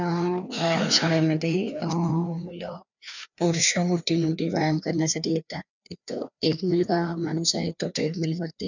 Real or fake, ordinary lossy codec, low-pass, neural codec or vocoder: fake; none; 7.2 kHz; codec, 16 kHz, 2 kbps, FreqCodec, larger model